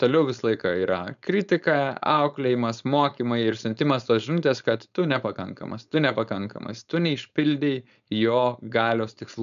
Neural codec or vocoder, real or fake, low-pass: codec, 16 kHz, 4.8 kbps, FACodec; fake; 7.2 kHz